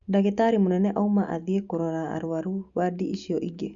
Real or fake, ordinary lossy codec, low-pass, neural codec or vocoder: real; Opus, 64 kbps; 7.2 kHz; none